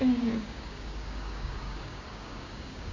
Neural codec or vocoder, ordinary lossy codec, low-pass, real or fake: codec, 16 kHz, 6 kbps, DAC; MP3, 32 kbps; 7.2 kHz; fake